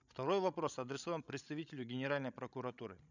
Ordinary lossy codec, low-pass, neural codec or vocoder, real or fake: none; 7.2 kHz; codec, 16 kHz, 16 kbps, FreqCodec, larger model; fake